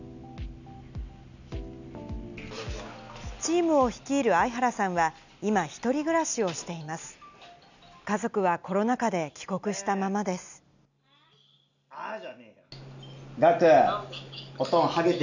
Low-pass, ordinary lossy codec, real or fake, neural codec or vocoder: 7.2 kHz; none; real; none